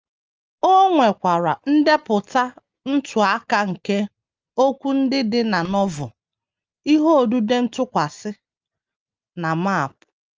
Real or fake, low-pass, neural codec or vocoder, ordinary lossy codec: real; 7.2 kHz; none; Opus, 24 kbps